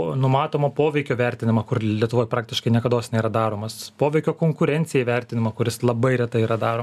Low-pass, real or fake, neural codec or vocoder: 14.4 kHz; real; none